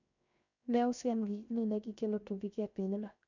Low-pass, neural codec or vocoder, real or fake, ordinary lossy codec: 7.2 kHz; codec, 16 kHz, 0.7 kbps, FocalCodec; fake; none